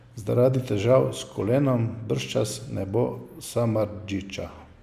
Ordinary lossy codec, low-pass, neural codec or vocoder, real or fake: Opus, 64 kbps; 14.4 kHz; none; real